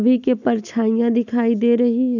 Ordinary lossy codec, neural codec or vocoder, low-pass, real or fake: none; none; 7.2 kHz; real